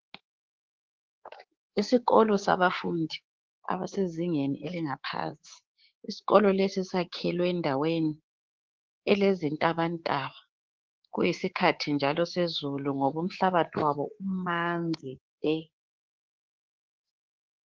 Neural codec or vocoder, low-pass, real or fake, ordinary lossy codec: codec, 24 kHz, 3.1 kbps, DualCodec; 7.2 kHz; fake; Opus, 16 kbps